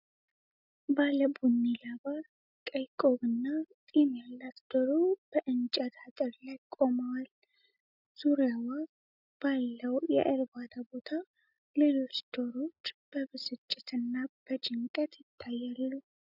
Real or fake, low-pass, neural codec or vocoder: real; 5.4 kHz; none